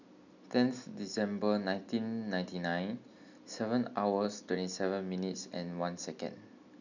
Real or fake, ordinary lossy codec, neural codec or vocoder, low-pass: real; none; none; 7.2 kHz